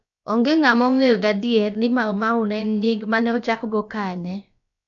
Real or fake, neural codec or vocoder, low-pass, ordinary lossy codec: fake; codec, 16 kHz, about 1 kbps, DyCAST, with the encoder's durations; 7.2 kHz; none